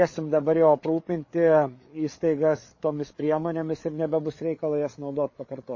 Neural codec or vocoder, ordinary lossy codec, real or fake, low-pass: codec, 16 kHz, 8 kbps, FreqCodec, larger model; MP3, 32 kbps; fake; 7.2 kHz